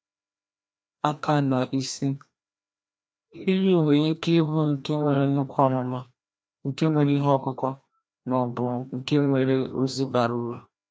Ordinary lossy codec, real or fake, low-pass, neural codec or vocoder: none; fake; none; codec, 16 kHz, 1 kbps, FreqCodec, larger model